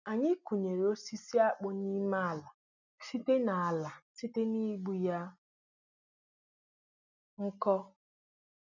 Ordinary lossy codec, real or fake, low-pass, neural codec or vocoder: none; real; 7.2 kHz; none